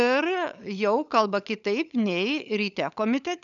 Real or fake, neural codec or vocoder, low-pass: fake; codec, 16 kHz, 16 kbps, FunCodec, trained on LibriTTS, 50 frames a second; 7.2 kHz